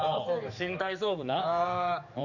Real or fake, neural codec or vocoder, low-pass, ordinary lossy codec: fake; codec, 16 kHz, 4 kbps, X-Codec, HuBERT features, trained on general audio; 7.2 kHz; none